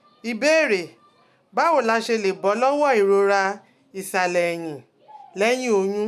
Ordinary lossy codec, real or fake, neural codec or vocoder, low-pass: none; real; none; 14.4 kHz